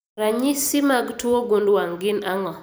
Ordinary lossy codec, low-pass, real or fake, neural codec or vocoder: none; none; fake; vocoder, 44.1 kHz, 128 mel bands every 256 samples, BigVGAN v2